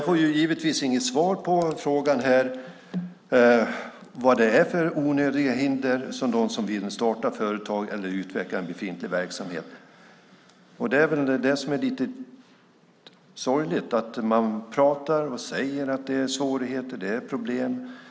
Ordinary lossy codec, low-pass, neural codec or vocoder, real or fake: none; none; none; real